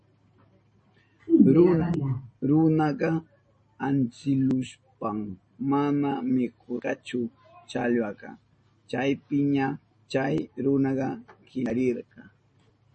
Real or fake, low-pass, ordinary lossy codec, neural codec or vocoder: real; 10.8 kHz; MP3, 32 kbps; none